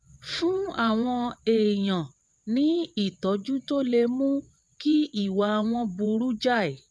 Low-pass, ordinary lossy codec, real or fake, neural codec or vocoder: none; none; fake; vocoder, 22.05 kHz, 80 mel bands, WaveNeXt